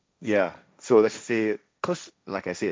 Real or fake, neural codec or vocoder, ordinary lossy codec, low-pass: fake; codec, 16 kHz, 1.1 kbps, Voila-Tokenizer; none; none